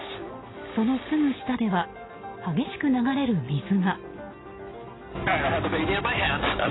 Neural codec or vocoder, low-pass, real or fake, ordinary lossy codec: none; 7.2 kHz; real; AAC, 16 kbps